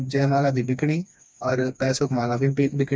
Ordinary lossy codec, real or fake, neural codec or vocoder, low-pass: none; fake; codec, 16 kHz, 2 kbps, FreqCodec, smaller model; none